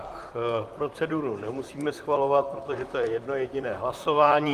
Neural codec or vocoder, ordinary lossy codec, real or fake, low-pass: vocoder, 44.1 kHz, 128 mel bands, Pupu-Vocoder; Opus, 32 kbps; fake; 14.4 kHz